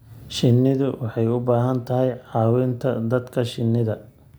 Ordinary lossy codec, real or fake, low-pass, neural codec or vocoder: none; real; none; none